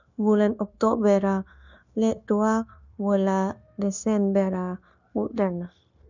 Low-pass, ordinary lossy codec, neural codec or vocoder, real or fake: 7.2 kHz; none; codec, 16 kHz, 0.9 kbps, LongCat-Audio-Codec; fake